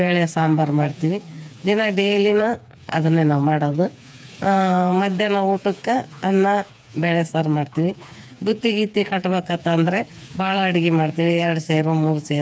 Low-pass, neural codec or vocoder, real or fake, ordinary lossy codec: none; codec, 16 kHz, 4 kbps, FreqCodec, smaller model; fake; none